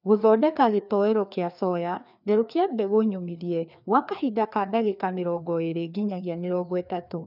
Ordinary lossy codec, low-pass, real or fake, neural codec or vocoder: none; 5.4 kHz; fake; codec, 16 kHz, 2 kbps, FreqCodec, larger model